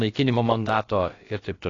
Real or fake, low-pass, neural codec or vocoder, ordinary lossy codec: fake; 7.2 kHz; codec, 16 kHz, about 1 kbps, DyCAST, with the encoder's durations; AAC, 32 kbps